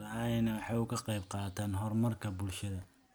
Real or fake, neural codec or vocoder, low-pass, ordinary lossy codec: real; none; none; none